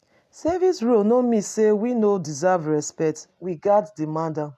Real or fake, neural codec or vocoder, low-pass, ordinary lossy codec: real; none; 14.4 kHz; none